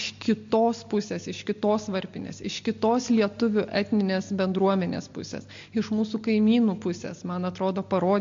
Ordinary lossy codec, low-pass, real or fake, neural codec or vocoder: MP3, 48 kbps; 7.2 kHz; real; none